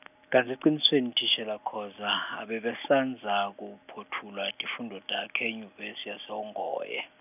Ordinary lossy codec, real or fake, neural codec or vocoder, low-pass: none; real; none; 3.6 kHz